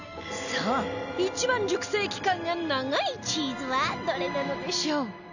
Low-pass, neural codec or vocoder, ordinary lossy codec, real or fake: 7.2 kHz; none; none; real